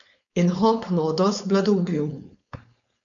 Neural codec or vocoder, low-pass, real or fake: codec, 16 kHz, 4.8 kbps, FACodec; 7.2 kHz; fake